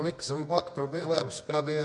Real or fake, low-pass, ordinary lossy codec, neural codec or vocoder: fake; 10.8 kHz; MP3, 64 kbps; codec, 24 kHz, 0.9 kbps, WavTokenizer, medium music audio release